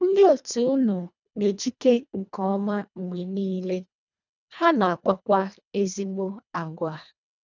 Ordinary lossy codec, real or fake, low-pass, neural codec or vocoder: none; fake; 7.2 kHz; codec, 24 kHz, 1.5 kbps, HILCodec